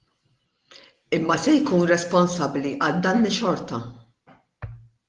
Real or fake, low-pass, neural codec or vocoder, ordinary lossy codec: real; 10.8 kHz; none; Opus, 24 kbps